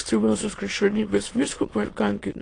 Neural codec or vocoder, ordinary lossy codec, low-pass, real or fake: autoencoder, 22.05 kHz, a latent of 192 numbers a frame, VITS, trained on many speakers; AAC, 32 kbps; 9.9 kHz; fake